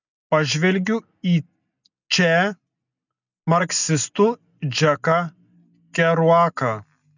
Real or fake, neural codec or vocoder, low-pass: real; none; 7.2 kHz